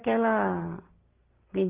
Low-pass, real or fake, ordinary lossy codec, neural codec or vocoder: 3.6 kHz; real; Opus, 32 kbps; none